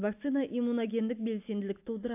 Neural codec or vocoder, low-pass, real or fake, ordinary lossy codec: none; 3.6 kHz; real; AAC, 32 kbps